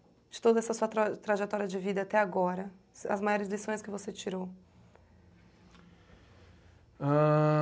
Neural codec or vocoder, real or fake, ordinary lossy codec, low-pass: none; real; none; none